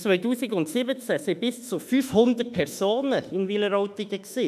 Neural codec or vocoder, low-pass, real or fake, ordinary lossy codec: autoencoder, 48 kHz, 32 numbers a frame, DAC-VAE, trained on Japanese speech; 14.4 kHz; fake; none